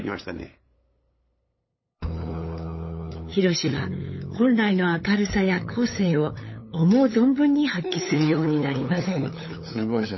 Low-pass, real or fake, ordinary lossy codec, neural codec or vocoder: 7.2 kHz; fake; MP3, 24 kbps; codec, 16 kHz, 8 kbps, FunCodec, trained on LibriTTS, 25 frames a second